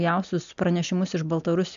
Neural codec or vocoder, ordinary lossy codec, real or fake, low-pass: none; AAC, 96 kbps; real; 7.2 kHz